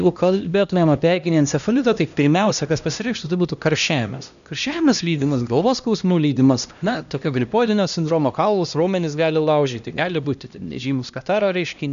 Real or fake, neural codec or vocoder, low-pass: fake; codec, 16 kHz, 1 kbps, X-Codec, HuBERT features, trained on LibriSpeech; 7.2 kHz